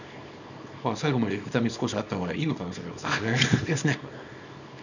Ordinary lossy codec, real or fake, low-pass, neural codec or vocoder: none; fake; 7.2 kHz; codec, 24 kHz, 0.9 kbps, WavTokenizer, small release